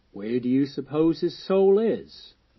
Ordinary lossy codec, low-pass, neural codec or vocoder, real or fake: MP3, 24 kbps; 7.2 kHz; none; real